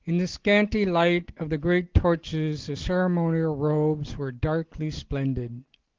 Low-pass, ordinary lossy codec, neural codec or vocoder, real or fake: 7.2 kHz; Opus, 16 kbps; none; real